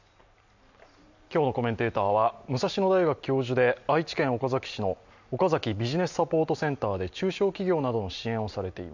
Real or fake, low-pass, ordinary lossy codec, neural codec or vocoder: real; 7.2 kHz; none; none